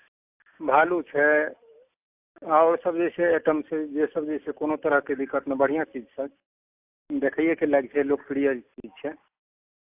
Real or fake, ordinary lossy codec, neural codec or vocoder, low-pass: real; AAC, 32 kbps; none; 3.6 kHz